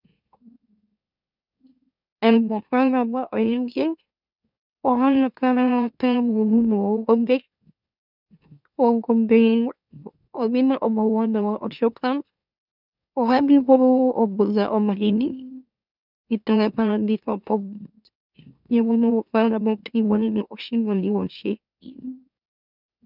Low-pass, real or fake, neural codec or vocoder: 5.4 kHz; fake; autoencoder, 44.1 kHz, a latent of 192 numbers a frame, MeloTTS